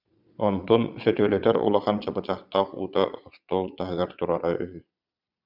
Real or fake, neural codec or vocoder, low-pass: fake; vocoder, 22.05 kHz, 80 mel bands, Vocos; 5.4 kHz